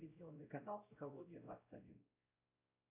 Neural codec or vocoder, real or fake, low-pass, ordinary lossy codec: codec, 16 kHz, 0.5 kbps, X-Codec, WavLM features, trained on Multilingual LibriSpeech; fake; 3.6 kHz; AAC, 32 kbps